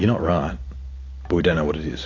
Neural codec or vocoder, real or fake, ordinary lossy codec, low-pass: none; real; AAC, 32 kbps; 7.2 kHz